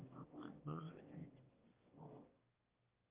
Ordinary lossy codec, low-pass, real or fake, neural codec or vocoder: Opus, 24 kbps; 3.6 kHz; fake; autoencoder, 22.05 kHz, a latent of 192 numbers a frame, VITS, trained on one speaker